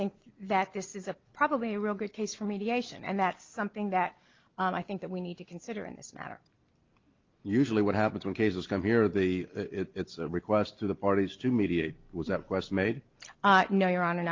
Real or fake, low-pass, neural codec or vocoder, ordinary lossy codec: real; 7.2 kHz; none; Opus, 32 kbps